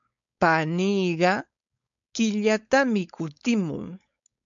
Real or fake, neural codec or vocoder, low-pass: fake; codec, 16 kHz, 4.8 kbps, FACodec; 7.2 kHz